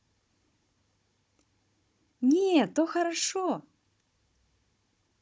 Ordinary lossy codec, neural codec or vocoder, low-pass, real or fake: none; codec, 16 kHz, 16 kbps, FunCodec, trained on Chinese and English, 50 frames a second; none; fake